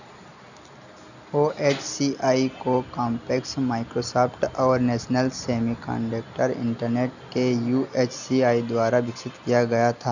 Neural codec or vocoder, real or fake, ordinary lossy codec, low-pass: none; real; none; 7.2 kHz